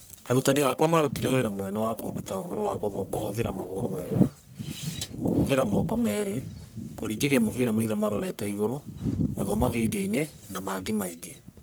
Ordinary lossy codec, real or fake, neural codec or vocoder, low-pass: none; fake; codec, 44.1 kHz, 1.7 kbps, Pupu-Codec; none